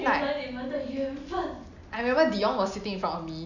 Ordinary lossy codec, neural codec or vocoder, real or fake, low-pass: none; none; real; 7.2 kHz